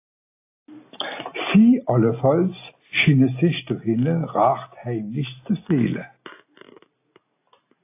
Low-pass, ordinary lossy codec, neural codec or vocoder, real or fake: 3.6 kHz; AAC, 24 kbps; none; real